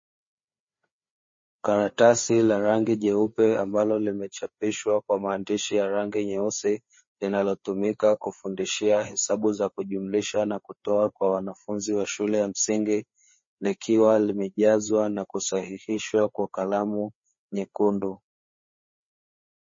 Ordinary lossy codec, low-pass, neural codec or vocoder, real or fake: MP3, 32 kbps; 7.2 kHz; codec, 16 kHz, 4 kbps, FreqCodec, larger model; fake